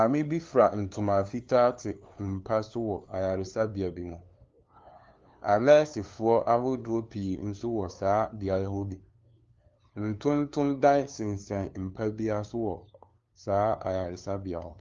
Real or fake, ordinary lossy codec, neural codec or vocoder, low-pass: fake; Opus, 32 kbps; codec, 16 kHz, 2 kbps, FunCodec, trained on LibriTTS, 25 frames a second; 7.2 kHz